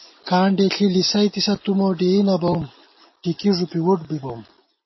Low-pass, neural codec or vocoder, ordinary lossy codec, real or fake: 7.2 kHz; none; MP3, 24 kbps; real